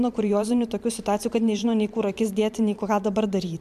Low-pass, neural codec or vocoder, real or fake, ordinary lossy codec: 14.4 kHz; vocoder, 44.1 kHz, 128 mel bands every 512 samples, BigVGAN v2; fake; MP3, 96 kbps